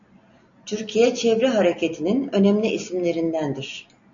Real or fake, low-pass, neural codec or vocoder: real; 7.2 kHz; none